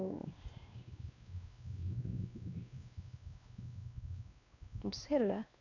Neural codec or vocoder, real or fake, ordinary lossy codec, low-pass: codec, 16 kHz, 2 kbps, X-Codec, WavLM features, trained on Multilingual LibriSpeech; fake; none; 7.2 kHz